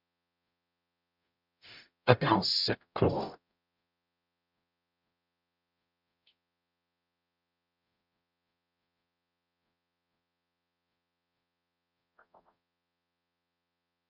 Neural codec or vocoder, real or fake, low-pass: codec, 44.1 kHz, 0.9 kbps, DAC; fake; 5.4 kHz